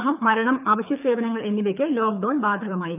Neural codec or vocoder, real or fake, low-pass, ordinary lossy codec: codec, 24 kHz, 6 kbps, HILCodec; fake; 3.6 kHz; none